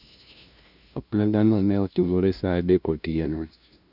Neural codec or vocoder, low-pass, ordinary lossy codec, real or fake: codec, 16 kHz, 0.5 kbps, FunCodec, trained on LibriTTS, 25 frames a second; 5.4 kHz; none; fake